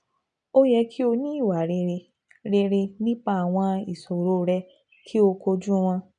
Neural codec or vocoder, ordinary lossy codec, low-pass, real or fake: none; none; 9.9 kHz; real